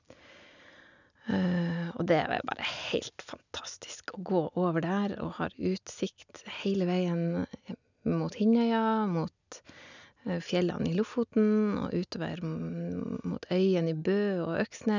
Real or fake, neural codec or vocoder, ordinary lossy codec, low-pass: real; none; none; 7.2 kHz